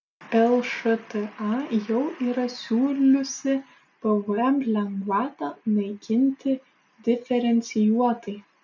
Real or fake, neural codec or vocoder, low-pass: real; none; 7.2 kHz